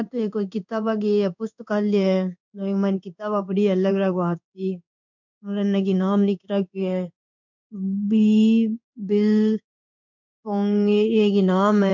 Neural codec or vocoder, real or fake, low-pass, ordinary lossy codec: codec, 16 kHz in and 24 kHz out, 1 kbps, XY-Tokenizer; fake; 7.2 kHz; none